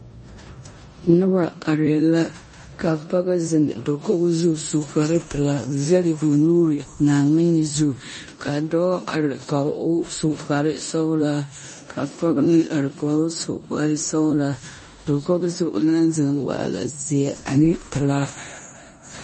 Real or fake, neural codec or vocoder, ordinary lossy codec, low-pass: fake; codec, 16 kHz in and 24 kHz out, 0.9 kbps, LongCat-Audio-Codec, four codebook decoder; MP3, 32 kbps; 10.8 kHz